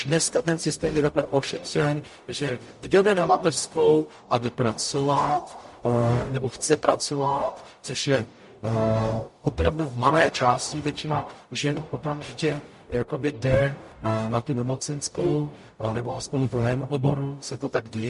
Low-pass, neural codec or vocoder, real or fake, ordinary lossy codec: 14.4 kHz; codec, 44.1 kHz, 0.9 kbps, DAC; fake; MP3, 48 kbps